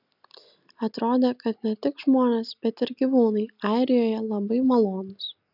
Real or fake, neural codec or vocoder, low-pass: real; none; 5.4 kHz